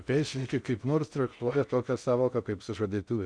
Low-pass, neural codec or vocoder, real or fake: 9.9 kHz; codec, 16 kHz in and 24 kHz out, 0.6 kbps, FocalCodec, streaming, 2048 codes; fake